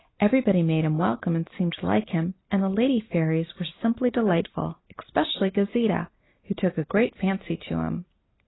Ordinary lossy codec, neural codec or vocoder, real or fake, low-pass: AAC, 16 kbps; none; real; 7.2 kHz